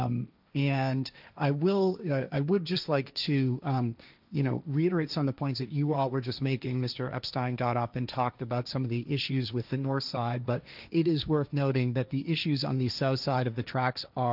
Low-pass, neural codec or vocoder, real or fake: 5.4 kHz; codec, 16 kHz, 1.1 kbps, Voila-Tokenizer; fake